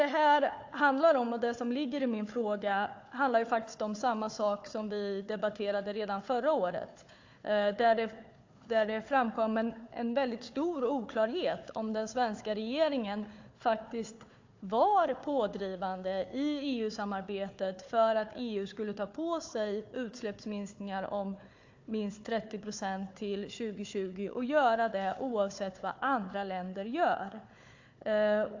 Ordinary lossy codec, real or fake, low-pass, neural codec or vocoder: AAC, 48 kbps; fake; 7.2 kHz; codec, 16 kHz, 4 kbps, FunCodec, trained on Chinese and English, 50 frames a second